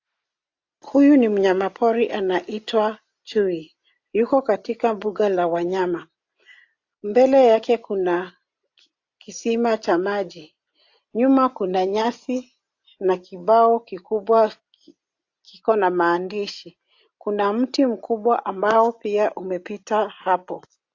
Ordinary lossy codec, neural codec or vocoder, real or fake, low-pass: AAC, 48 kbps; none; real; 7.2 kHz